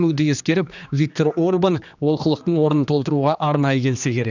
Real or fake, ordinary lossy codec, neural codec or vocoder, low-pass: fake; none; codec, 16 kHz, 2 kbps, X-Codec, HuBERT features, trained on general audio; 7.2 kHz